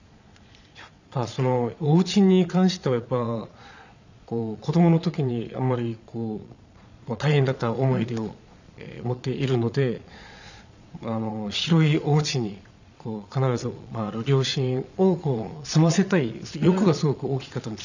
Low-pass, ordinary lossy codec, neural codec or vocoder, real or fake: 7.2 kHz; none; vocoder, 22.05 kHz, 80 mel bands, Vocos; fake